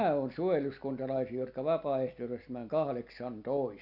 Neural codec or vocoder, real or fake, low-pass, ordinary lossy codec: none; real; 5.4 kHz; none